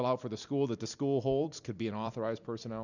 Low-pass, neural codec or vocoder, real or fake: 7.2 kHz; vocoder, 44.1 kHz, 128 mel bands every 256 samples, BigVGAN v2; fake